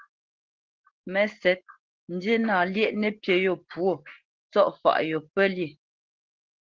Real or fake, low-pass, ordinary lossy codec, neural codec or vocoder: real; 7.2 kHz; Opus, 16 kbps; none